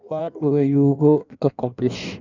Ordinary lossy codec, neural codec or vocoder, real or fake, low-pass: none; codec, 16 kHz in and 24 kHz out, 1.1 kbps, FireRedTTS-2 codec; fake; 7.2 kHz